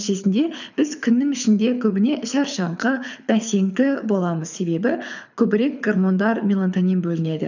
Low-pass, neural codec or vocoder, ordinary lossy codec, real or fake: 7.2 kHz; codec, 24 kHz, 6 kbps, HILCodec; none; fake